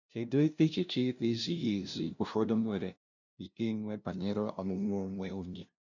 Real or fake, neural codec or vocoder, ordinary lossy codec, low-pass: fake; codec, 16 kHz, 0.5 kbps, FunCodec, trained on LibriTTS, 25 frames a second; none; 7.2 kHz